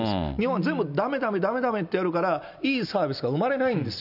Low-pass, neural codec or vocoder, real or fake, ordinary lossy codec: 5.4 kHz; none; real; none